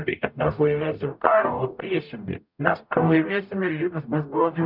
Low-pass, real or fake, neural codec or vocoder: 5.4 kHz; fake; codec, 44.1 kHz, 0.9 kbps, DAC